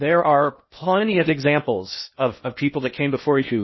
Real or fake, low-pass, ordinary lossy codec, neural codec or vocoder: fake; 7.2 kHz; MP3, 24 kbps; codec, 16 kHz in and 24 kHz out, 0.8 kbps, FocalCodec, streaming, 65536 codes